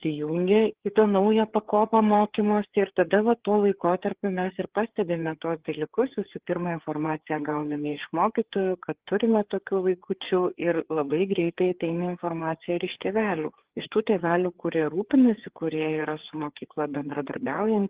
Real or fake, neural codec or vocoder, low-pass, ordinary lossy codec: fake; codec, 16 kHz, 4 kbps, FreqCodec, larger model; 3.6 kHz; Opus, 16 kbps